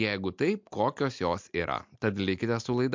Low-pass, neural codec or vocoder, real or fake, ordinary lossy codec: 7.2 kHz; none; real; MP3, 64 kbps